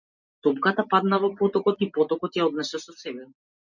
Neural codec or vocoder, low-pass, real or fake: none; 7.2 kHz; real